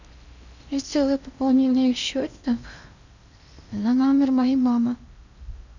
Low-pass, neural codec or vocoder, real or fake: 7.2 kHz; codec, 16 kHz in and 24 kHz out, 0.8 kbps, FocalCodec, streaming, 65536 codes; fake